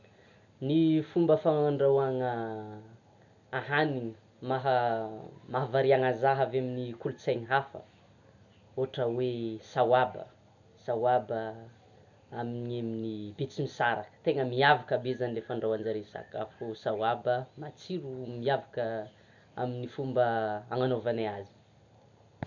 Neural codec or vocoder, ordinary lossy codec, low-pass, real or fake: none; none; 7.2 kHz; real